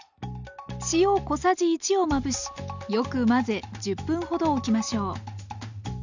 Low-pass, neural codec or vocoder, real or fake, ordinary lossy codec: 7.2 kHz; none; real; none